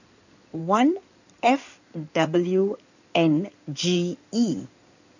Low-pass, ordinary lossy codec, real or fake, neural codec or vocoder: 7.2 kHz; none; fake; codec, 16 kHz in and 24 kHz out, 2.2 kbps, FireRedTTS-2 codec